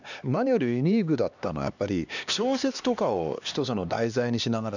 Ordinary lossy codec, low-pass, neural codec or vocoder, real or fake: none; 7.2 kHz; codec, 16 kHz, 2 kbps, X-Codec, HuBERT features, trained on LibriSpeech; fake